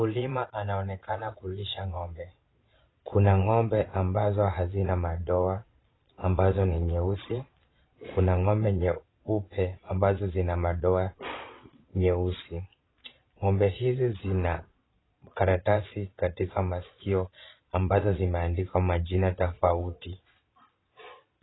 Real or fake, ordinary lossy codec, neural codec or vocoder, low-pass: fake; AAC, 16 kbps; vocoder, 22.05 kHz, 80 mel bands, Vocos; 7.2 kHz